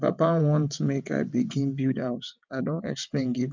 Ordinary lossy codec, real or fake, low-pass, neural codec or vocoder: MP3, 64 kbps; fake; 7.2 kHz; vocoder, 22.05 kHz, 80 mel bands, WaveNeXt